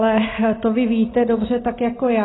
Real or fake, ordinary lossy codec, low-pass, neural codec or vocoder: real; AAC, 16 kbps; 7.2 kHz; none